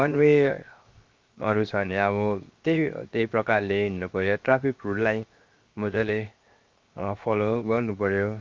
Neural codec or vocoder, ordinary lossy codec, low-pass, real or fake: codec, 16 kHz, 0.7 kbps, FocalCodec; Opus, 24 kbps; 7.2 kHz; fake